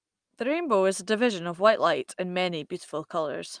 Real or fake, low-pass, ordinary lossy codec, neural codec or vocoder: real; 9.9 kHz; Opus, 32 kbps; none